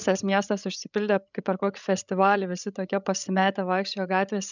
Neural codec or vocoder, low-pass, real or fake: codec, 16 kHz, 16 kbps, FreqCodec, larger model; 7.2 kHz; fake